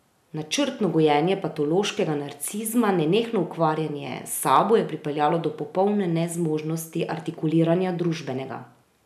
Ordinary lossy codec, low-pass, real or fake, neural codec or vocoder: none; 14.4 kHz; real; none